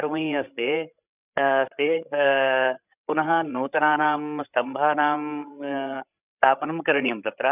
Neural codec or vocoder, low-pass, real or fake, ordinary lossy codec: codec, 16 kHz, 16 kbps, FreqCodec, larger model; 3.6 kHz; fake; none